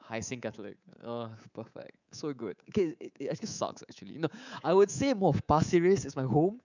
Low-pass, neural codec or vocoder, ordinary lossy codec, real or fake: 7.2 kHz; codec, 24 kHz, 3.1 kbps, DualCodec; none; fake